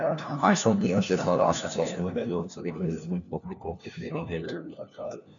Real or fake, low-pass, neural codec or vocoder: fake; 7.2 kHz; codec, 16 kHz, 1 kbps, FunCodec, trained on LibriTTS, 50 frames a second